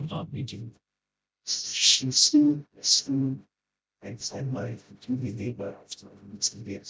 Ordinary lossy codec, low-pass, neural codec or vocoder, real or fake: none; none; codec, 16 kHz, 0.5 kbps, FreqCodec, smaller model; fake